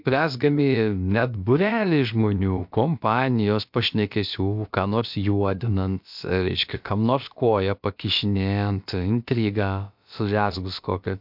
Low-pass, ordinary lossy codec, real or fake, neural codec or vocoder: 5.4 kHz; MP3, 48 kbps; fake; codec, 16 kHz, about 1 kbps, DyCAST, with the encoder's durations